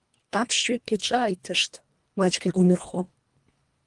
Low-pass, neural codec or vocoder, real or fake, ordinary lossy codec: 10.8 kHz; codec, 24 kHz, 1.5 kbps, HILCodec; fake; Opus, 32 kbps